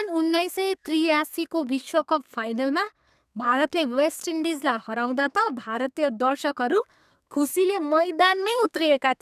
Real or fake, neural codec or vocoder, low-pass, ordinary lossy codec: fake; codec, 32 kHz, 1.9 kbps, SNAC; 14.4 kHz; none